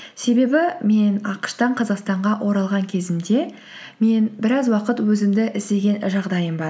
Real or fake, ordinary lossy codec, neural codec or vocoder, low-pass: real; none; none; none